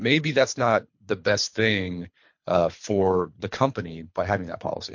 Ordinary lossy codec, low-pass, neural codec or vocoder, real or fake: MP3, 48 kbps; 7.2 kHz; codec, 24 kHz, 3 kbps, HILCodec; fake